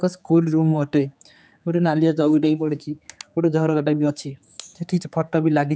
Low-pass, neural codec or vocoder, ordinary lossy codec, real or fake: none; codec, 16 kHz, 4 kbps, X-Codec, HuBERT features, trained on general audio; none; fake